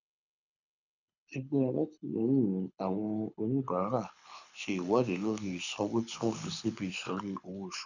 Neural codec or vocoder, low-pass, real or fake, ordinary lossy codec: codec, 24 kHz, 6 kbps, HILCodec; 7.2 kHz; fake; none